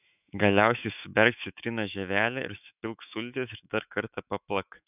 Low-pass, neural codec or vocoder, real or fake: 3.6 kHz; autoencoder, 48 kHz, 128 numbers a frame, DAC-VAE, trained on Japanese speech; fake